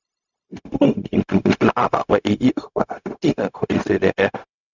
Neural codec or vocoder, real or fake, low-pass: codec, 16 kHz, 0.4 kbps, LongCat-Audio-Codec; fake; 7.2 kHz